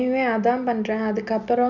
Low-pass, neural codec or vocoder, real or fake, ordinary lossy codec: 7.2 kHz; none; real; none